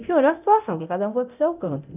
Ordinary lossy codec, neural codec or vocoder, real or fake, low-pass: none; codec, 24 kHz, 0.9 kbps, DualCodec; fake; 3.6 kHz